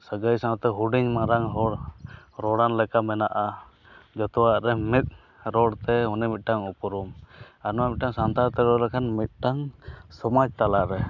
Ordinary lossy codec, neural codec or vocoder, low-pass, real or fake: none; none; 7.2 kHz; real